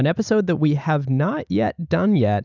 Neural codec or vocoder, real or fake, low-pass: none; real; 7.2 kHz